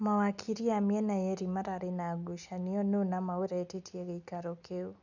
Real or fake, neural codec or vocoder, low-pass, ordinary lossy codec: real; none; 7.2 kHz; none